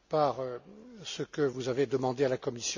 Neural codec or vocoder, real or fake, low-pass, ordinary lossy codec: none; real; 7.2 kHz; none